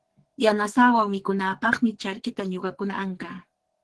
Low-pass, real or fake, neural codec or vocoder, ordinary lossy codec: 10.8 kHz; fake; codec, 44.1 kHz, 2.6 kbps, SNAC; Opus, 16 kbps